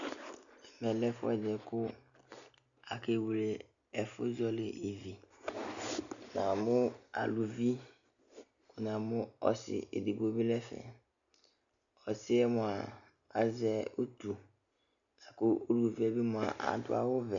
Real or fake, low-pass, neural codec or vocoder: real; 7.2 kHz; none